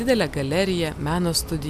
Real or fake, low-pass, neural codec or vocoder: real; 14.4 kHz; none